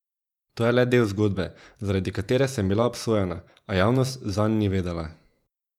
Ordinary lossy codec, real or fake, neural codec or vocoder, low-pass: none; fake; vocoder, 48 kHz, 128 mel bands, Vocos; 19.8 kHz